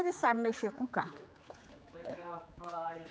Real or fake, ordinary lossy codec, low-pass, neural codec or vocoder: fake; none; none; codec, 16 kHz, 4 kbps, X-Codec, HuBERT features, trained on general audio